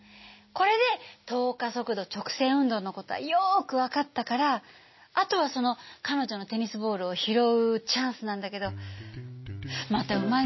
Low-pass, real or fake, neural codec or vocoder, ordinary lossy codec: 7.2 kHz; real; none; MP3, 24 kbps